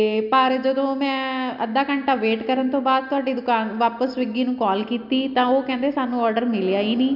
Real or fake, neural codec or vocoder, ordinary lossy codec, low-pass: real; none; none; 5.4 kHz